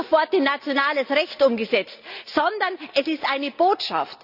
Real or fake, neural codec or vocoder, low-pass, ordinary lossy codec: real; none; 5.4 kHz; none